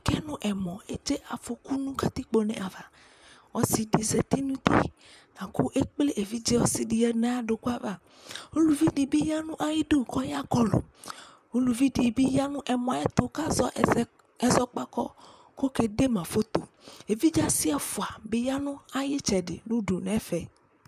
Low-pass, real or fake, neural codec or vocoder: 14.4 kHz; fake; vocoder, 44.1 kHz, 128 mel bands, Pupu-Vocoder